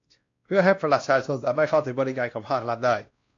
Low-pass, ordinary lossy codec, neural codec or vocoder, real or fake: 7.2 kHz; AAC, 48 kbps; codec, 16 kHz, 1 kbps, X-Codec, WavLM features, trained on Multilingual LibriSpeech; fake